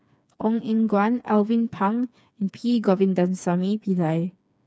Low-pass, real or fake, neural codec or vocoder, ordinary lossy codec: none; fake; codec, 16 kHz, 4 kbps, FreqCodec, smaller model; none